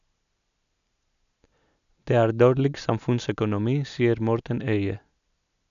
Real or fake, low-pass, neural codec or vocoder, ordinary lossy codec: real; 7.2 kHz; none; none